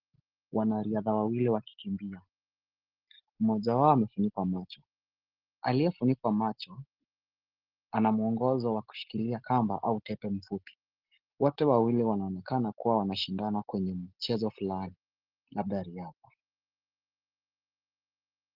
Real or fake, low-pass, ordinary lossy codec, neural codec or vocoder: real; 5.4 kHz; Opus, 16 kbps; none